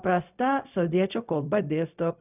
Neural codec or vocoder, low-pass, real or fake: codec, 16 kHz, 0.4 kbps, LongCat-Audio-Codec; 3.6 kHz; fake